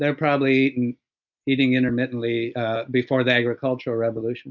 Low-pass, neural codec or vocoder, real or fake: 7.2 kHz; none; real